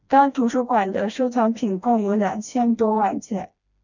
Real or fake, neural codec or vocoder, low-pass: fake; codec, 16 kHz, 1 kbps, FreqCodec, smaller model; 7.2 kHz